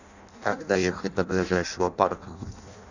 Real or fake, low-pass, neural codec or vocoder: fake; 7.2 kHz; codec, 16 kHz in and 24 kHz out, 0.6 kbps, FireRedTTS-2 codec